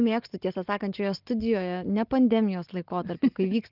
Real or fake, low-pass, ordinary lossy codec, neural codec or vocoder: real; 5.4 kHz; Opus, 32 kbps; none